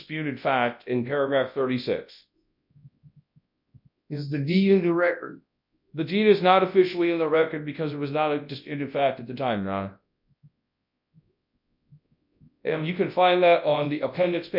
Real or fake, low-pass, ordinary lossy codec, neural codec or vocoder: fake; 5.4 kHz; MP3, 48 kbps; codec, 24 kHz, 0.9 kbps, WavTokenizer, large speech release